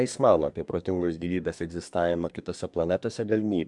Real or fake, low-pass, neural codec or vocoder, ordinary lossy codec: fake; 10.8 kHz; codec, 24 kHz, 1 kbps, SNAC; MP3, 96 kbps